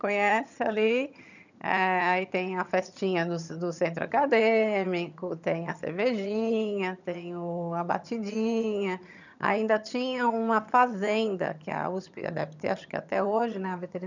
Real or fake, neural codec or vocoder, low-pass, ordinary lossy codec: fake; vocoder, 22.05 kHz, 80 mel bands, HiFi-GAN; 7.2 kHz; none